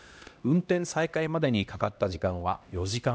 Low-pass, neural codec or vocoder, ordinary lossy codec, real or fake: none; codec, 16 kHz, 1 kbps, X-Codec, HuBERT features, trained on LibriSpeech; none; fake